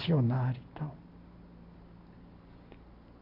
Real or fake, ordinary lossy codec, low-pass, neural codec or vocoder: real; AAC, 32 kbps; 5.4 kHz; none